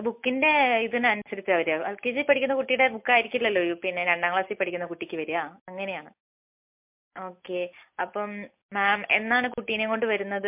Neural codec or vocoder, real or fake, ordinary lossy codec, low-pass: none; real; MP3, 32 kbps; 3.6 kHz